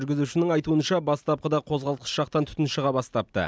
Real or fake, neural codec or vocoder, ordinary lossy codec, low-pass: real; none; none; none